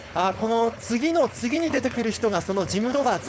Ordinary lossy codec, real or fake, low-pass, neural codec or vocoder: none; fake; none; codec, 16 kHz, 4.8 kbps, FACodec